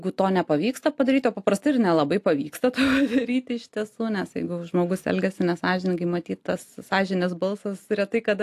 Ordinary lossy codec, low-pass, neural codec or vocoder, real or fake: AAC, 64 kbps; 14.4 kHz; none; real